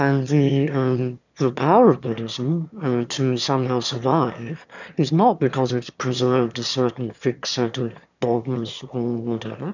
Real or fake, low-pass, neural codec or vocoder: fake; 7.2 kHz; autoencoder, 22.05 kHz, a latent of 192 numbers a frame, VITS, trained on one speaker